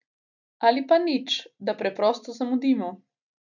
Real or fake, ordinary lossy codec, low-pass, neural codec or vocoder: real; none; 7.2 kHz; none